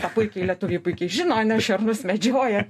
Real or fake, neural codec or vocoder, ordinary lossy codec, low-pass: real; none; MP3, 64 kbps; 14.4 kHz